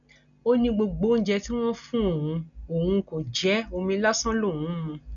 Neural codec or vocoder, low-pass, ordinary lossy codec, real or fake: none; 7.2 kHz; none; real